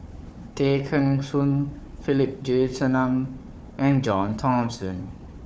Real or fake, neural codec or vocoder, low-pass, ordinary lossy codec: fake; codec, 16 kHz, 4 kbps, FunCodec, trained on Chinese and English, 50 frames a second; none; none